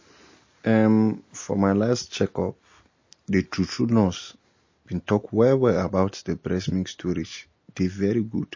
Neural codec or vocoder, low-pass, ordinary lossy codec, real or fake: none; 7.2 kHz; MP3, 32 kbps; real